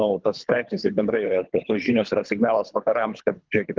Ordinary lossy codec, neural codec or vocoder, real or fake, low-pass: Opus, 32 kbps; codec, 24 kHz, 3 kbps, HILCodec; fake; 7.2 kHz